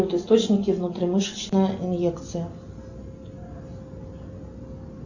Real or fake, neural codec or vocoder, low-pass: real; none; 7.2 kHz